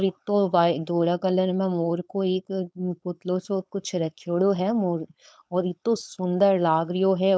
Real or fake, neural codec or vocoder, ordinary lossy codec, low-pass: fake; codec, 16 kHz, 4.8 kbps, FACodec; none; none